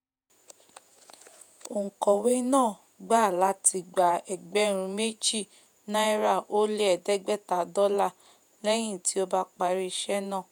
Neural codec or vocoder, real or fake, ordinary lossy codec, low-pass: vocoder, 48 kHz, 128 mel bands, Vocos; fake; none; none